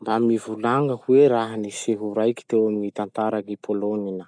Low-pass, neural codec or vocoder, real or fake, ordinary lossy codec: 9.9 kHz; none; real; none